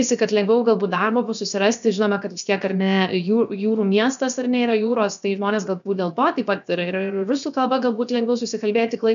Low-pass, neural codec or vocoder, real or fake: 7.2 kHz; codec, 16 kHz, 0.7 kbps, FocalCodec; fake